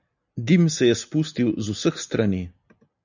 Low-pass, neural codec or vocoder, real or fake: 7.2 kHz; none; real